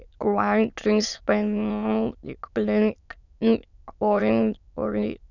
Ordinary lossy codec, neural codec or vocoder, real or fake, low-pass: none; autoencoder, 22.05 kHz, a latent of 192 numbers a frame, VITS, trained on many speakers; fake; 7.2 kHz